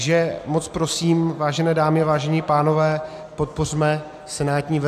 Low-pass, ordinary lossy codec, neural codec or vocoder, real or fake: 14.4 kHz; AAC, 96 kbps; none; real